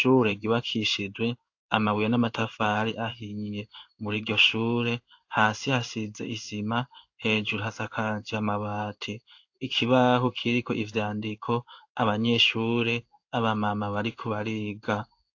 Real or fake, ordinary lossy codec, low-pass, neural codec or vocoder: fake; AAC, 48 kbps; 7.2 kHz; codec, 16 kHz in and 24 kHz out, 1 kbps, XY-Tokenizer